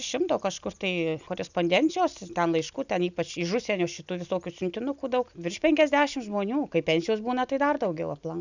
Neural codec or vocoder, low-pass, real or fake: none; 7.2 kHz; real